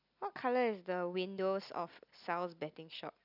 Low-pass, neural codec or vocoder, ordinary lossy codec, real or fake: 5.4 kHz; none; none; real